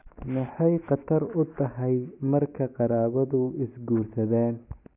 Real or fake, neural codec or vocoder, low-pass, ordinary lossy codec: real; none; 3.6 kHz; none